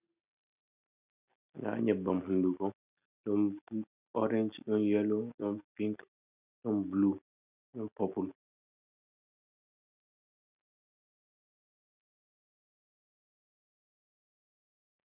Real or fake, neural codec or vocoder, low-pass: real; none; 3.6 kHz